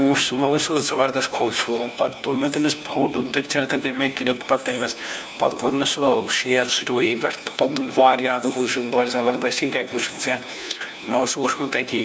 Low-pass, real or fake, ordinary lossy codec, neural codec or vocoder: none; fake; none; codec, 16 kHz, 1 kbps, FunCodec, trained on LibriTTS, 50 frames a second